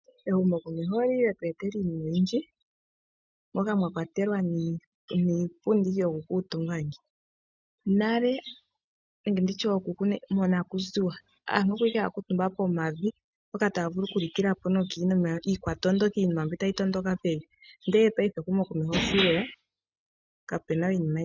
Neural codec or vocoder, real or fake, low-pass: none; real; 7.2 kHz